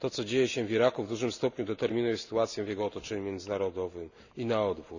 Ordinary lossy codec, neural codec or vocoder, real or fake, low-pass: none; none; real; 7.2 kHz